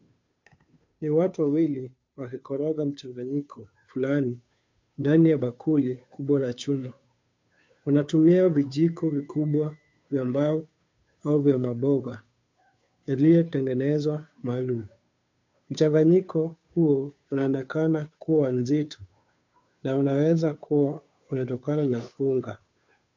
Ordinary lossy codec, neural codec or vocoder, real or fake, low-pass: MP3, 48 kbps; codec, 16 kHz, 2 kbps, FunCodec, trained on Chinese and English, 25 frames a second; fake; 7.2 kHz